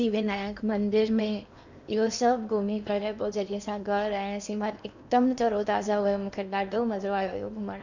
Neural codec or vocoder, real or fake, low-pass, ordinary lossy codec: codec, 16 kHz in and 24 kHz out, 0.8 kbps, FocalCodec, streaming, 65536 codes; fake; 7.2 kHz; none